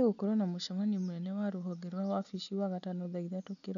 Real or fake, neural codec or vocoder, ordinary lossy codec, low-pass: real; none; none; 7.2 kHz